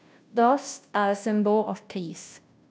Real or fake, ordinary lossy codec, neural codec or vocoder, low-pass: fake; none; codec, 16 kHz, 0.5 kbps, FunCodec, trained on Chinese and English, 25 frames a second; none